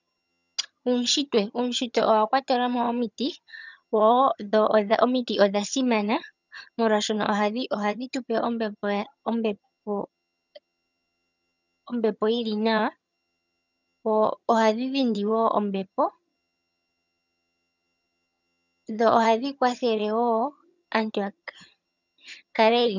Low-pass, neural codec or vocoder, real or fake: 7.2 kHz; vocoder, 22.05 kHz, 80 mel bands, HiFi-GAN; fake